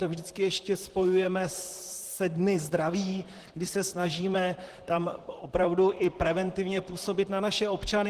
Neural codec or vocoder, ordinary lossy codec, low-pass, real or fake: vocoder, 44.1 kHz, 128 mel bands, Pupu-Vocoder; Opus, 16 kbps; 14.4 kHz; fake